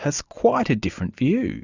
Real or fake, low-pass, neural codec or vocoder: real; 7.2 kHz; none